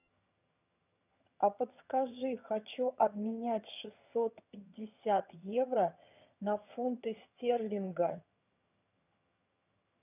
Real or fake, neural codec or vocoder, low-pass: fake; vocoder, 22.05 kHz, 80 mel bands, HiFi-GAN; 3.6 kHz